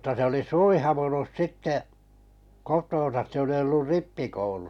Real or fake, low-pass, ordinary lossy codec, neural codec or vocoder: real; 19.8 kHz; none; none